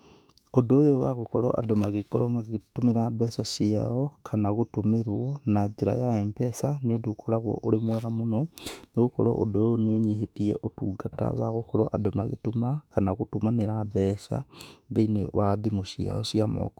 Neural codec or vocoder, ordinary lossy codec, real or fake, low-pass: autoencoder, 48 kHz, 32 numbers a frame, DAC-VAE, trained on Japanese speech; none; fake; 19.8 kHz